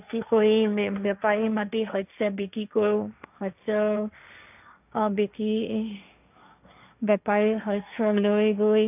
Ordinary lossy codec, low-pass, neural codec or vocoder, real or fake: none; 3.6 kHz; codec, 16 kHz, 1.1 kbps, Voila-Tokenizer; fake